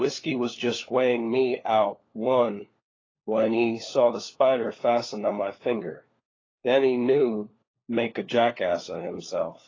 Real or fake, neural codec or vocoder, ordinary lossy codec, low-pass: fake; codec, 16 kHz, 4 kbps, FunCodec, trained on LibriTTS, 50 frames a second; AAC, 32 kbps; 7.2 kHz